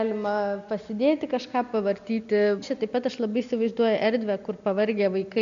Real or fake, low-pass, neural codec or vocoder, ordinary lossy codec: real; 7.2 kHz; none; AAC, 64 kbps